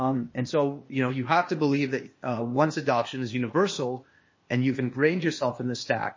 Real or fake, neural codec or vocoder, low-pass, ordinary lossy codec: fake; codec, 16 kHz, 0.8 kbps, ZipCodec; 7.2 kHz; MP3, 32 kbps